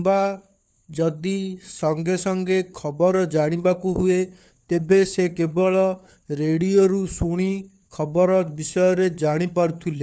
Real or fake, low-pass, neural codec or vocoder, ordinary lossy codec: fake; none; codec, 16 kHz, 4 kbps, FunCodec, trained on LibriTTS, 50 frames a second; none